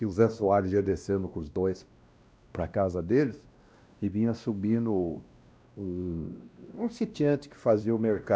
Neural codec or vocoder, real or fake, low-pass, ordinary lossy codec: codec, 16 kHz, 1 kbps, X-Codec, WavLM features, trained on Multilingual LibriSpeech; fake; none; none